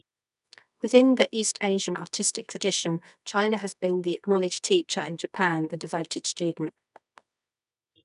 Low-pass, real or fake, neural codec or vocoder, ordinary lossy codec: 10.8 kHz; fake; codec, 24 kHz, 0.9 kbps, WavTokenizer, medium music audio release; none